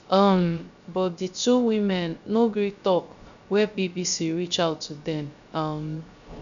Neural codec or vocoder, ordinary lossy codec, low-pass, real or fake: codec, 16 kHz, 0.3 kbps, FocalCodec; none; 7.2 kHz; fake